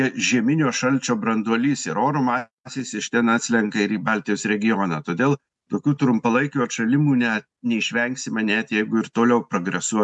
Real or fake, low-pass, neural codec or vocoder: real; 10.8 kHz; none